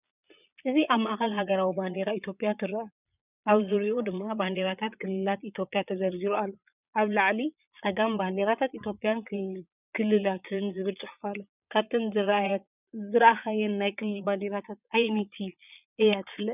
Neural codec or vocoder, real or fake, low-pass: vocoder, 22.05 kHz, 80 mel bands, Vocos; fake; 3.6 kHz